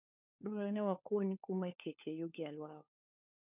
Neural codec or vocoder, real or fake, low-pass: codec, 16 kHz, 4 kbps, FunCodec, trained on LibriTTS, 50 frames a second; fake; 3.6 kHz